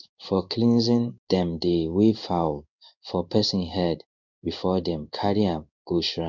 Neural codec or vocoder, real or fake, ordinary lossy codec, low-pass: codec, 16 kHz in and 24 kHz out, 1 kbps, XY-Tokenizer; fake; none; 7.2 kHz